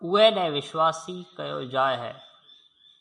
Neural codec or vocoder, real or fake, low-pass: none; real; 10.8 kHz